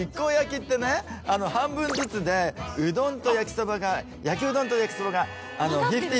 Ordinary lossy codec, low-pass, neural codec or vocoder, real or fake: none; none; none; real